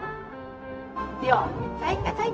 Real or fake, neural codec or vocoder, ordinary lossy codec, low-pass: fake; codec, 16 kHz, 0.4 kbps, LongCat-Audio-Codec; none; none